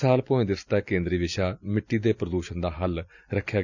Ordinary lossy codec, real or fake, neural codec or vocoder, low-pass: none; real; none; 7.2 kHz